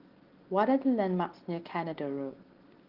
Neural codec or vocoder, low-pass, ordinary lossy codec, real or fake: none; 5.4 kHz; Opus, 16 kbps; real